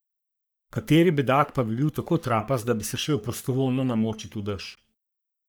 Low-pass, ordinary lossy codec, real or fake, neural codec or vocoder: none; none; fake; codec, 44.1 kHz, 3.4 kbps, Pupu-Codec